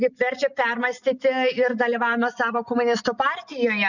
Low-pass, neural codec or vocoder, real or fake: 7.2 kHz; none; real